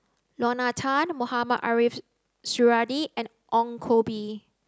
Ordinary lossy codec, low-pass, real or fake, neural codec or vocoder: none; none; real; none